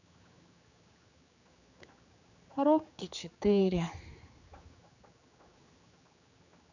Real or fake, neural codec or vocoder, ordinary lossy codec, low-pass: fake; codec, 16 kHz, 4 kbps, X-Codec, HuBERT features, trained on general audio; none; 7.2 kHz